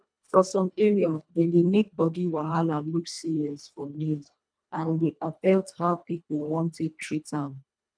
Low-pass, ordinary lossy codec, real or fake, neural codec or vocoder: 9.9 kHz; none; fake; codec, 24 kHz, 1.5 kbps, HILCodec